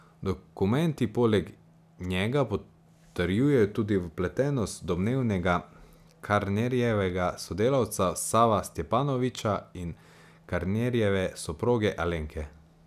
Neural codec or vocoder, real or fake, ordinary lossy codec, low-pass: none; real; none; 14.4 kHz